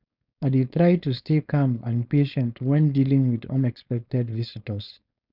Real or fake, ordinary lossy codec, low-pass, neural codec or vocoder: fake; none; 5.4 kHz; codec, 16 kHz, 4.8 kbps, FACodec